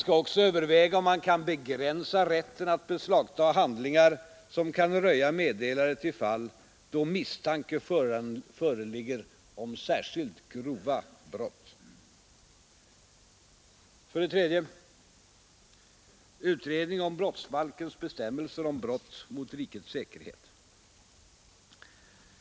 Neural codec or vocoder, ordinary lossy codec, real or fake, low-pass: none; none; real; none